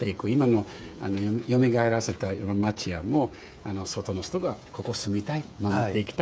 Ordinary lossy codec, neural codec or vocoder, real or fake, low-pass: none; codec, 16 kHz, 8 kbps, FreqCodec, smaller model; fake; none